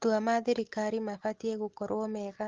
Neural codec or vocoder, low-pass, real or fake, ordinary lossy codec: none; 7.2 kHz; real; Opus, 16 kbps